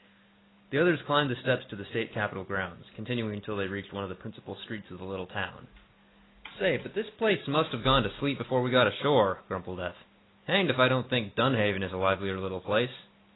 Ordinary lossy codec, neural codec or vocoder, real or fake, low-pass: AAC, 16 kbps; none; real; 7.2 kHz